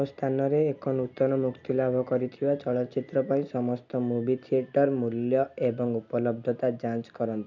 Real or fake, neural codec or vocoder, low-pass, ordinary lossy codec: real; none; 7.2 kHz; none